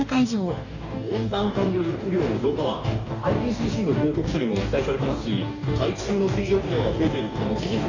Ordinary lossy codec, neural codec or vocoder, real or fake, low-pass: none; codec, 44.1 kHz, 2.6 kbps, DAC; fake; 7.2 kHz